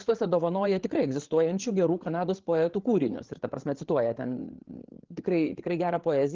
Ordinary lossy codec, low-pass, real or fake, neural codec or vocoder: Opus, 16 kbps; 7.2 kHz; fake; codec, 16 kHz, 8 kbps, FreqCodec, larger model